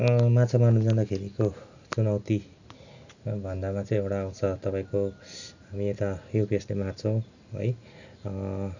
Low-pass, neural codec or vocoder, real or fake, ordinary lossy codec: 7.2 kHz; none; real; none